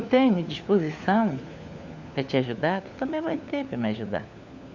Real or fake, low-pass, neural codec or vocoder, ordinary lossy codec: fake; 7.2 kHz; codec, 16 kHz, 4 kbps, FunCodec, trained on LibriTTS, 50 frames a second; Opus, 64 kbps